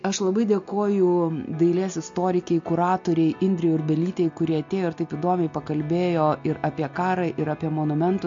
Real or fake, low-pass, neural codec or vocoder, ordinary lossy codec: real; 7.2 kHz; none; MP3, 48 kbps